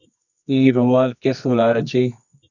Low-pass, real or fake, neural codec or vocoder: 7.2 kHz; fake; codec, 24 kHz, 0.9 kbps, WavTokenizer, medium music audio release